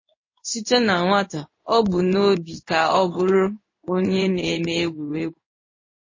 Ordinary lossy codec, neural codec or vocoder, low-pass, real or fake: MP3, 32 kbps; codec, 16 kHz in and 24 kHz out, 1 kbps, XY-Tokenizer; 7.2 kHz; fake